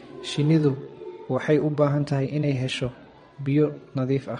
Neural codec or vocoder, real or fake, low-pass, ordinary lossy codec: vocoder, 22.05 kHz, 80 mel bands, WaveNeXt; fake; 9.9 kHz; MP3, 48 kbps